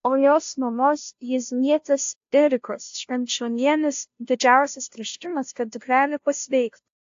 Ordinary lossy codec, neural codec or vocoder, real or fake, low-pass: AAC, 48 kbps; codec, 16 kHz, 0.5 kbps, FunCodec, trained on Chinese and English, 25 frames a second; fake; 7.2 kHz